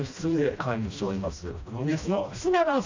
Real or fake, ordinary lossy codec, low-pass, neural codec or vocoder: fake; AAC, 48 kbps; 7.2 kHz; codec, 16 kHz, 1 kbps, FreqCodec, smaller model